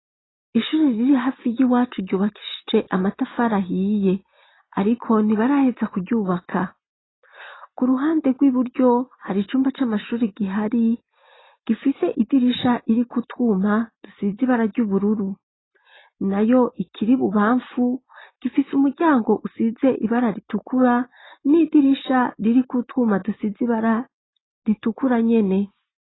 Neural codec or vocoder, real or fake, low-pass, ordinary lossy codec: none; real; 7.2 kHz; AAC, 16 kbps